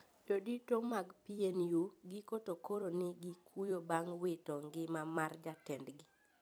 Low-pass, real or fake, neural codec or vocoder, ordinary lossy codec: none; fake; vocoder, 44.1 kHz, 128 mel bands every 256 samples, BigVGAN v2; none